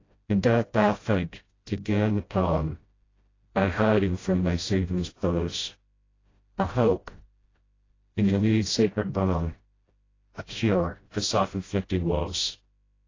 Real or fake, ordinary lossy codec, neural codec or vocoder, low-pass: fake; AAC, 32 kbps; codec, 16 kHz, 0.5 kbps, FreqCodec, smaller model; 7.2 kHz